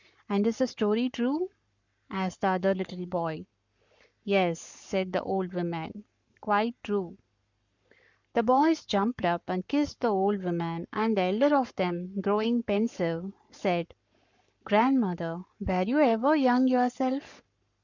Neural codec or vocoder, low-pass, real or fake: codec, 44.1 kHz, 7.8 kbps, Pupu-Codec; 7.2 kHz; fake